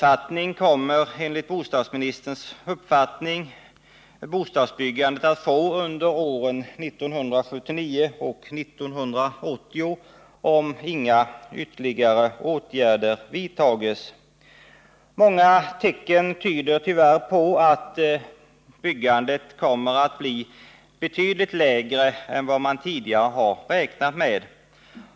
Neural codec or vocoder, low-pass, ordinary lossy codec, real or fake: none; none; none; real